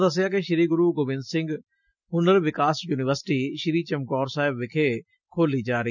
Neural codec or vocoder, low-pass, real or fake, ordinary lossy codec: none; 7.2 kHz; real; none